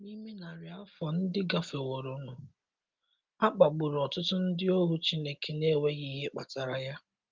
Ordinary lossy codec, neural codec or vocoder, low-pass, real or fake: Opus, 32 kbps; none; 7.2 kHz; real